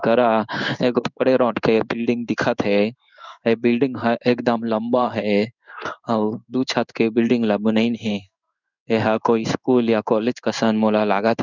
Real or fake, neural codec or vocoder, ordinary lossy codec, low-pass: fake; codec, 16 kHz in and 24 kHz out, 1 kbps, XY-Tokenizer; none; 7.2 kHz